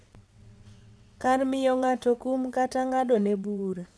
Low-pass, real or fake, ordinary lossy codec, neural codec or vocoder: none; fake; none; vocoder, 22.05 kHz, 80 mel bands, WaveNeXt